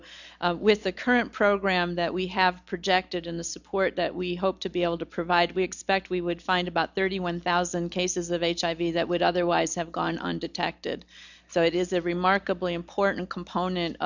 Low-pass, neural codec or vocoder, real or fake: 7.2 kHz; none; real